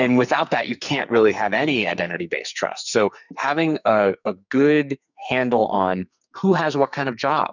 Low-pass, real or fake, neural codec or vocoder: 7.2 kHz; fake; codec, 44.1 kHz, 2.6 kbps, SNAC